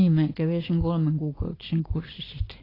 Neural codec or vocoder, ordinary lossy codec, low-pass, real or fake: none; AAC, 24 kbps; 5.4 kHz; real